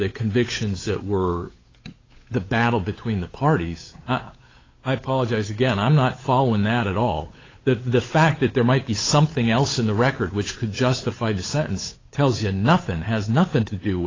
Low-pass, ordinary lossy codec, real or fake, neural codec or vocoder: 7.2 kHz; AAC, 32 kbps; fake; codec, 16 kHz, 8 kbps, FunCodec, trained on Chinese and English, 25 frames a second